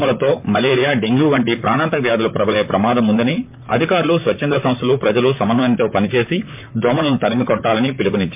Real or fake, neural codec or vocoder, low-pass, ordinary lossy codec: fake; vocoder, 44.1 kHz, 128 mel bands, Pupu-Vocoder; 3.6 kHz; MP3, 32 kbps